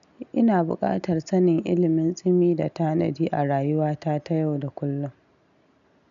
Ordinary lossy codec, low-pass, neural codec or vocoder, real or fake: none; 7.2 kHz; none; real